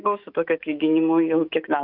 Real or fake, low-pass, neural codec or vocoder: fake; 5.4 kHz; autoencoder, 48 kHz, 128 numbers a frame, DAC-VAE, trained on Japanese speech